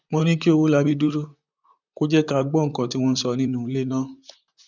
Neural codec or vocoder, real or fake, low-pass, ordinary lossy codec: vocoder, 44.1 kHz, 128 mel bands, Pupu-Vocoder; fake; 7.2 kHz; none